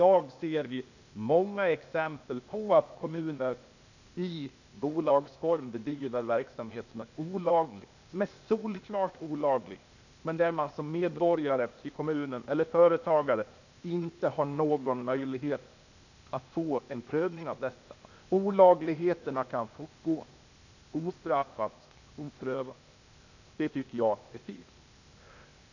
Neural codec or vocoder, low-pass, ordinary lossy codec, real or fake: codec, 16 kHz, 0.8 kbps, ZipCodec; 7.2 kHz; none; fake